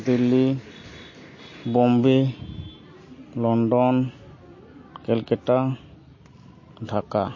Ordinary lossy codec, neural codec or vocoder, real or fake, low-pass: MP3, 32 kbps; none; real; 7.2 kHz